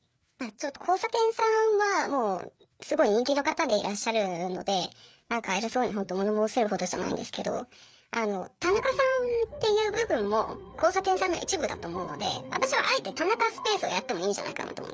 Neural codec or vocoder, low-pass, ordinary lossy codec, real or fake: codec, 16 kHz, 4 kbps, FreqCodec, larger model; none; none; fake